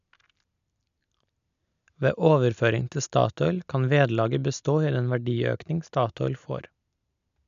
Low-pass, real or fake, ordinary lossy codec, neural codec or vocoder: 7.2 kHz; real; none; none